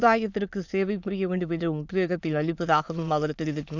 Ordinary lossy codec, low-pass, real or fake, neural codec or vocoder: none; 7.2 kHz; fake; autoencoder, 22.05 kHz, a latent of 192 numbers a frame, VITS, trained on many speakers